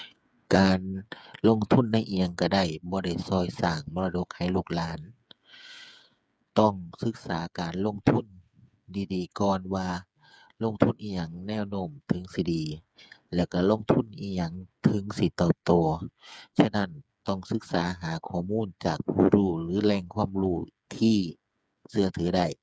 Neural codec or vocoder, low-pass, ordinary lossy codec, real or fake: codec, 16 kHz, 8 kbps, FreqCodec, smaller model; none; none; fake